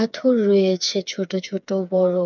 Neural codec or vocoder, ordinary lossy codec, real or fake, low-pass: codec, 16 kHz, 4 kbps, FreqCodec, smaller model; none; fake; 7.2 kHz